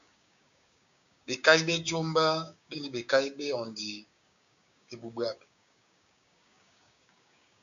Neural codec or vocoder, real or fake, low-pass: codec, 16 kHz, 6 kbps, DAC; fake; 7.2 kHz